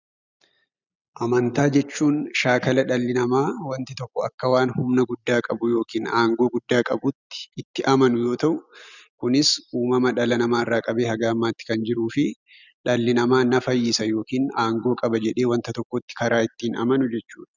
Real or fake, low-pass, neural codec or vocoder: real; 7.2 kHz; none